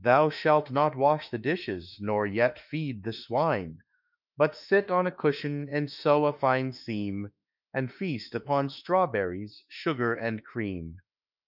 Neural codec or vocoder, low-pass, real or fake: autoencoder, 48 kHz, 32 numbers a frame, DAC-VAE, trained on Japanese speech; 5.4 kHz; fake